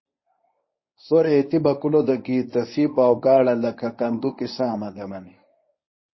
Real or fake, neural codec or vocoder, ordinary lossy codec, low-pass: fake; codec, 24 kHz, 0.9 kbps, WavTokenizer, medium speech release version 1; MP3, 24 kbps; 7.2 kHz